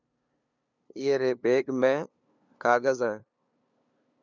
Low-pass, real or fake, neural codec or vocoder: 7.2 kHz; fake; codec, 16 kHz, 2 kbps, FunCodec, trained on LibriTTS, 25 frames a second